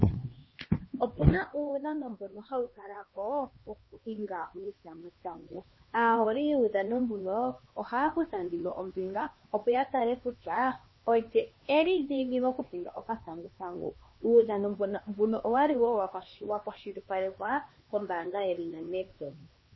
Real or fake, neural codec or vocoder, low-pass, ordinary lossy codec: fake; codec, 16 kHz, 2 kbps, X-Codec, HuBERT features, trained on LibriSpeech; 7.2 kHz; MP3, 24 kbps